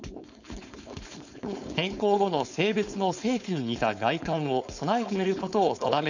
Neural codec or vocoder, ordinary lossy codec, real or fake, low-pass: codec, 16 kHz, 4.8 kbps, FACodec; none; fake; 7.2 kHz